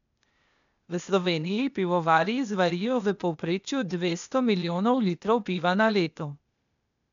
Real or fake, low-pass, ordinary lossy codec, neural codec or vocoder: fake; 7.2 kHz; none; codec, 16 kHz, 0.8 kbps, ZipCodec